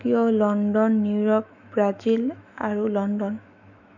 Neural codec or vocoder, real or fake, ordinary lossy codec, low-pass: autoencoder, 48 kHz, 128 numbers a frame, DAC-VAE, trained on Japanese speech; fake; none; 7.2 kHz